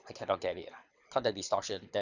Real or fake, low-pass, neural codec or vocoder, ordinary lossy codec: fake; 7.2 kHz; codec, 24 kHz, 6 kbps, HILCodec; none